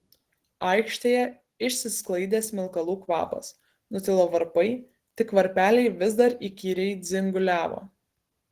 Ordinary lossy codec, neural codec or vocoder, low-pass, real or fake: Opus, 16 kbps; none; 14.4 kHz; real